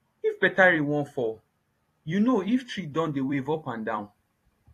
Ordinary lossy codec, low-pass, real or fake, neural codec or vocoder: AAC, 48 kbps; 14.4 kHz; fake; vocoder, 44.1 kHz, 128 mel bands every 256 samples, BigVGAN v2